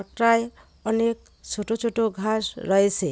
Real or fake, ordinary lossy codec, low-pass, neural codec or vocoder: real; none; none; none